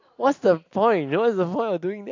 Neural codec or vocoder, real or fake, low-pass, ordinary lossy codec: none; real; 7.2 kHz; AAC, 48 kbps